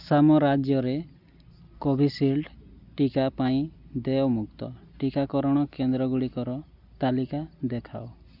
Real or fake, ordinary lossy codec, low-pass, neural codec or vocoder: real; none; 5.4 kHz; none